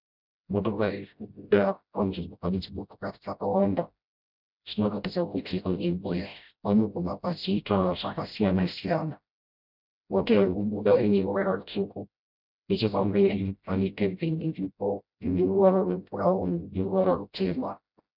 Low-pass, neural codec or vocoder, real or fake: 5.4 kHz; codec, 16 kHz, 0.5 kbps, FreqCodec, smaller model; fake